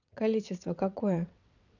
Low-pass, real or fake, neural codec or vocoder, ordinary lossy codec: 7.2 kHz; fake; vocoder, 22.05 kHz, 80 mel bands, WaveNeXt; none